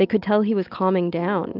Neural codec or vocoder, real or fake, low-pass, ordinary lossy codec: none; real; 5.4 kHz; Opus, 24 kbps